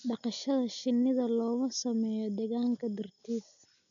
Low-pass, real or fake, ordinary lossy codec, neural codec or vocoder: 7.2 kHz; real; none; none